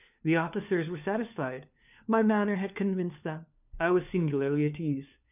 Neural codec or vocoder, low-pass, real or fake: codec, 16 kHz, 4 kbps, FunCodec, trained on LibriTTS, 50 frames a second; 3.6 kHz; fake